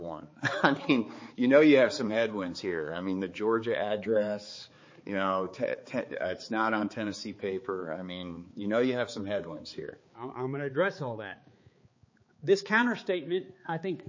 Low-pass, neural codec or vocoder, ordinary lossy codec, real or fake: 7.2 kHz; codec, 16 kHz, 4 kbps, X-Codec, HuBERT features, trained on balanced general audio; MP3, 32 kbps; fake